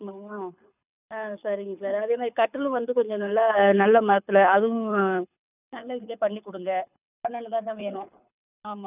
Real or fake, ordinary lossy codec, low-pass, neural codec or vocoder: fake; none; 3.6 kHz; codec, 24 kHz, 6 kbps, HILCodec